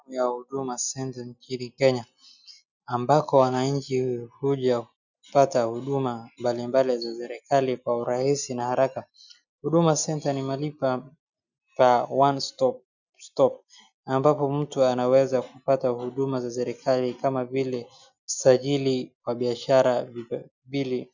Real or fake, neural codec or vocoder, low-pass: real; none; 7.2 kHz